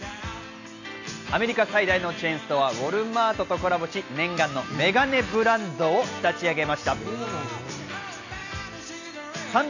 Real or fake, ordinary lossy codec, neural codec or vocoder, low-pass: real; none; none; 7.2 kHz